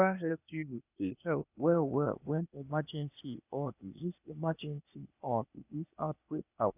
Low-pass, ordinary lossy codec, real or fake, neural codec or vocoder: 3.6 kHz; none; fake; codec, 16 kHz, 0.8 kbps, ZipCodec